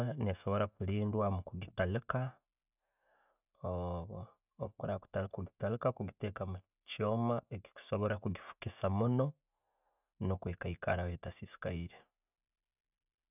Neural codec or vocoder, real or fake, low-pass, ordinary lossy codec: none; real; 3.6 kHz; none